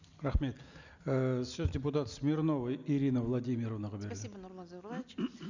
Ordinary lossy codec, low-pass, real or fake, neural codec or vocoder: none; 7.2 kHz; real; none